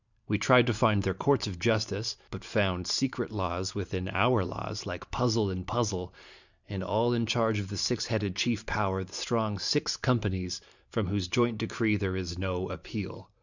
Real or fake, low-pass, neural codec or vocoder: fake; 7.2 kHz; vocoder, 44.1 kHz, 128 mel bands every 512 samples, BigVGAN v2